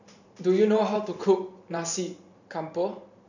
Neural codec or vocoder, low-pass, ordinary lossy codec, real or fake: vocoder, 44.1 kHz, 128 mel bands every 512 samples, BigVGAN v2; 7.2 kHz; none; fake